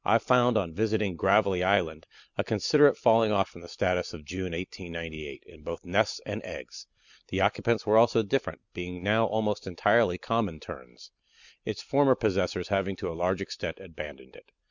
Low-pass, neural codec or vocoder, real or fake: 7.2 kHz; none; real